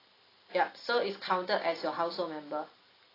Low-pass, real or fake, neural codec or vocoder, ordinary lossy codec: 5.4 kHz; real; none; AAC, 24 kbps